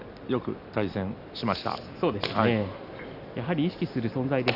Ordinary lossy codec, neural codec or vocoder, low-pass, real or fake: none; none; 5.4 kHz; real